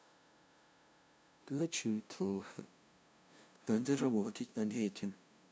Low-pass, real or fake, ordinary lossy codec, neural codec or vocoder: none; fake; none; codec, 16 kHz, 0.5 kbps, FunCodec, trained on LibriTTS, 25 frames a second